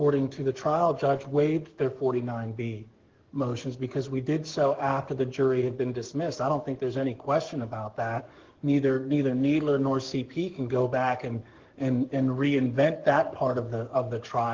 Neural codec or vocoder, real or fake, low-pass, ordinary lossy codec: codec, 44.1 kHz, 7.8 kbps, DAC; fake; 7.2 kHz; Opus, 16 kbps